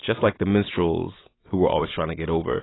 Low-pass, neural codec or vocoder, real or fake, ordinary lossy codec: 7.2 kHz; none; real; AAC, 16 kbps